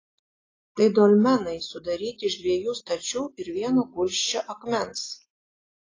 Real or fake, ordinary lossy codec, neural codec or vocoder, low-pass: real; AAC, 32 kbps; none; 7.2 kHz